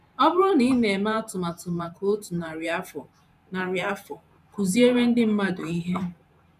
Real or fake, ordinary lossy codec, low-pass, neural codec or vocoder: fake; none; 14.4 kHz; vocoder, 44.1 kHz, 128 mel bands every 512 samples, BigVGAN v2